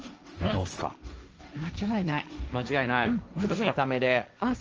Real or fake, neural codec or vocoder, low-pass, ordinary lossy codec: fake; codec, 16 kHz, 1.1 kbps, Voila-Tokenizer; 7.2 kHz; Opus, 24 kbps